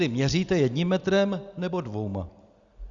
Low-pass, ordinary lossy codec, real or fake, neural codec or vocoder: 7.2 kHz; Opus, 64 kbps; real; none